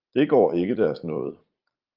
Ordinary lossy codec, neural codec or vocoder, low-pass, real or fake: Opus, 32 kbps; vocoder, 44.1 kHz, 80 mel bands, Vocos; 5.4 kHz; fake